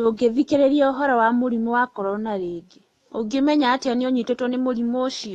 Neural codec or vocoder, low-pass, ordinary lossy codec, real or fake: autoencoder, 48 kHz, 128 numbers a frame, DAC-VAE, trained on Japanese speech; 19.8 kHz; AAC, 32 kbps; fake